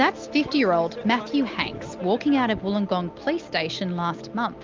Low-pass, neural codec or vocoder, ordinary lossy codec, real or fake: 7.2 kHz; none; Opus, 24 kbps; real